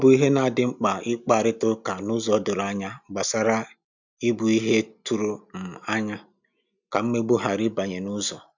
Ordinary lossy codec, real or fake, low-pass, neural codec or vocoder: none; real; 7.2 kHz; none